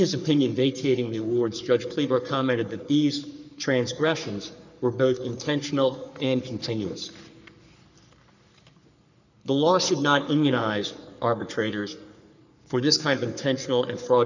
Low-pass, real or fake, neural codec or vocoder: 7.2 kHz; fake; codec, 44.1 kHz, 3.4 kbps, Pupu-Codec